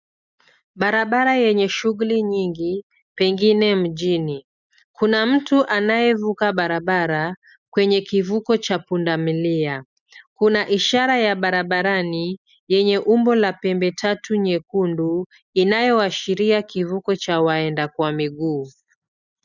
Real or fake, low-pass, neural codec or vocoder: real; 7.2 kHz; none